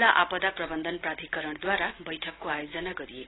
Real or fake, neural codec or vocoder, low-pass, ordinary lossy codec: real; none; 7.2 kHz; AAC, 16 kbps